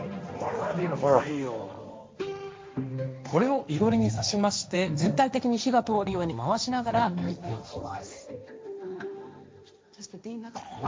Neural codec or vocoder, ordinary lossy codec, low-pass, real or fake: codec, 16 kHz, 1.1 kbps, Voila-Tokenizer; none; none; fake